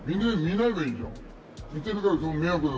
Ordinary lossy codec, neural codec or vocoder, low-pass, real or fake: none; none; none; real